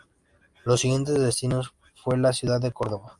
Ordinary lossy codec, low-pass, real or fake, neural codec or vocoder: Opus, 32 kbps; 10.8 kHz; real; none